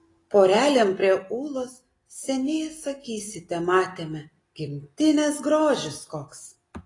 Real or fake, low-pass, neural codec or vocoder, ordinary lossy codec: real; 10.8 kHz; none; AAC, 32 kbps